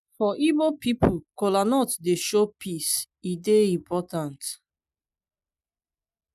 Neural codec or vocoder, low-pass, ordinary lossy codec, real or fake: none; 14.4 kHz; none; real